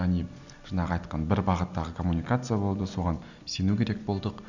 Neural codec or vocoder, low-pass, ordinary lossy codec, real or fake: none; 7.2 kHz; none; real